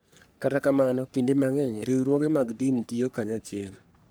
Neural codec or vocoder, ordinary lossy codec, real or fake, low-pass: codec, 44.1 kHz, 3.4 kbps, Pupu-Codec; none; fake; none